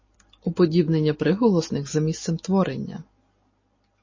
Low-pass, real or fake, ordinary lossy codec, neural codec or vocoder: 7.2 kHz; real; MP3, 32 kbps; none